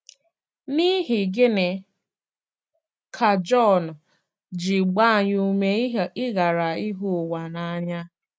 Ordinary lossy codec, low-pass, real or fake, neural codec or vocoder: none; none; real; none